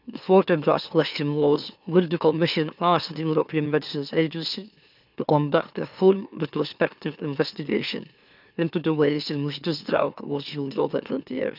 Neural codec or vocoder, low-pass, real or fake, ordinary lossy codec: autoencoder, 44.1 kHz, a latent of 192 numbers a frame, MeloTTS; 5.4 kHz; fake; none